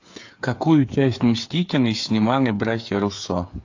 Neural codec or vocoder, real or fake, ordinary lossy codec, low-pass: codec, 16 kHz, 8 kbps, FreqCodec, smaller model; fake; AAC, 48 kbps; 7.2 kHz